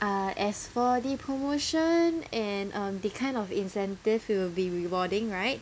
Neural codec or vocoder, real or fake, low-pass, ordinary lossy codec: none; real; none; none